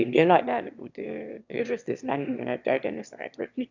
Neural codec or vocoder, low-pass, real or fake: autoencoder, 22.05 kHz, a latent of 192 numbers a frame, VITS, trained on one speaker; 7.2 kHz; fake